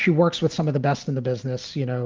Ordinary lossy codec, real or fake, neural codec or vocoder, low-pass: Opus, 24 kbps; real; none; 7.2 kHz